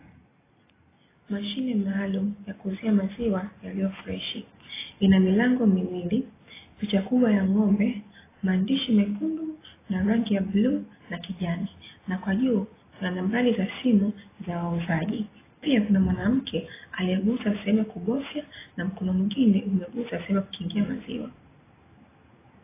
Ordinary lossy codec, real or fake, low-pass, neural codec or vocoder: AAC, 16 kbps; real; 3.6 kHz; none